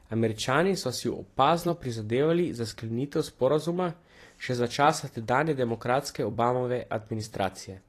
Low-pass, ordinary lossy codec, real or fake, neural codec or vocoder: 14.4 kHz; AAC, 48 kbps; fake; vocoder, 44.1 kHz, 128 mel bands every 256 samples, BigVGAN v2